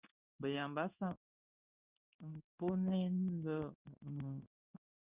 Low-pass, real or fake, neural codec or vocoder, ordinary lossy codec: 3.6 kHz; fake; codec, 16 kHz in and 24 kHz out, 1 kbps, XY-Tokenizer; Opus, 64 kbps